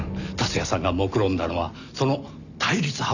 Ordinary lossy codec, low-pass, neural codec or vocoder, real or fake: none; 7.2 kHz; none; real